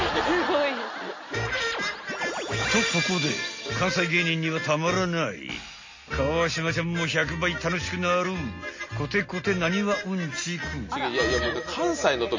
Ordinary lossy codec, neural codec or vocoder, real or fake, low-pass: MP3, 32 kbps; none; real; 7.2 kHz